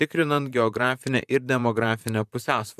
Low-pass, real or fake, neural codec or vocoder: 14.4 kHz; fake; vocoder, 44.1 kHz, 128 mel bands, Pupu-Vocoder